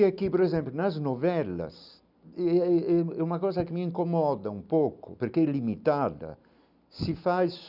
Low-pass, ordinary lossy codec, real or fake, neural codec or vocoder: 5.4 kHz; none; real; none